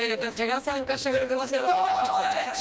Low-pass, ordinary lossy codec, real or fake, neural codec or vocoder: none; none; fake; codec, 16 kHz, 1 kbps, FreqCodec, smaller model